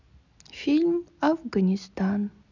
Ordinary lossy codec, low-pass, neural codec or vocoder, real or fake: none; 7.2 kHz; none; real